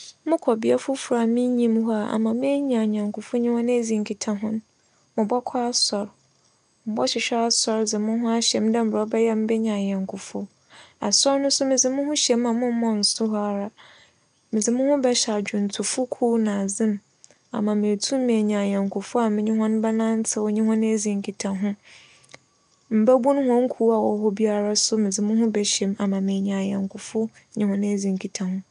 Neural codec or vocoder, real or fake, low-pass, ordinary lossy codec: none; real; 9.9 kHz; none